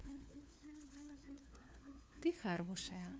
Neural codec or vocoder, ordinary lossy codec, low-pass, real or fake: codec, 16 kHz, 4 kbps, FunCodec, trained on LibriTTS, 50 frames a second; none; none; fake